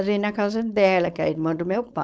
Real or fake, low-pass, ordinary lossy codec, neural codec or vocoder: fake; none; none; codec, 16 kHz, 4.8 kbps, FACodec